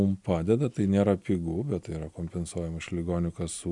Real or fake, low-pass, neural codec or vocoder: real; 10.8 kHz; none